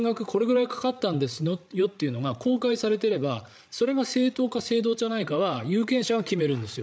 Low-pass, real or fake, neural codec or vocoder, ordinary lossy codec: none; fake; codec, 16 kHz, 8 kbps, FreqCodec, larger model; none